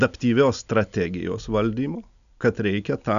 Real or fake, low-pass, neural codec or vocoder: real; 7.2 kHz; none